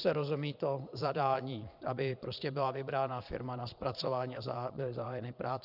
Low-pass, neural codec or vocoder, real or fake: 5.4 kHz; vocoder, 22.05 kHz, 80 mel bands, Vocos; fake